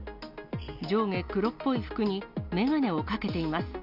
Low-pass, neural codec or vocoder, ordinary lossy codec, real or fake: 5.4 kHz; none; AAC, 48 kbps; real